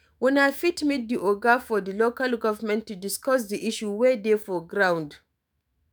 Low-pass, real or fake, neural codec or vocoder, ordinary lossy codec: none; fake; autoencoder, 48 kHz, 128 numbers a frame, DAC-VAE, trained on Japanese speech; none